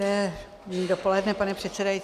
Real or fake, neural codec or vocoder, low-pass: real; none; 14.4 kHz